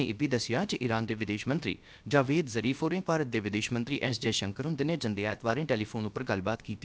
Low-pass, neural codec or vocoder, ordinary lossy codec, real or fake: none; codec, 16 kHz, about 1 kbps, DyCAST, with the encoder's durations; none; fake